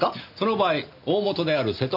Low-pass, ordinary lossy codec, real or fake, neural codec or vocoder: 5.4 kHz; none; real; none